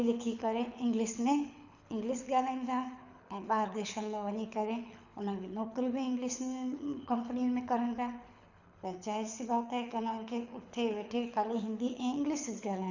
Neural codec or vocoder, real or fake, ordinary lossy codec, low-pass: codec, 24 kHz, 6 kbps, HILCodec; fake; none; 7.2 kHz